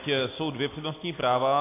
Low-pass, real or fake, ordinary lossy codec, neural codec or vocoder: 3.6 kHz; real; AAC, 24 kbps; none